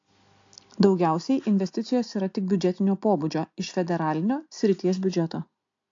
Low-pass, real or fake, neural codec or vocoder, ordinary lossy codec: 7.2 kHz; real; none; AAC, 48 kbps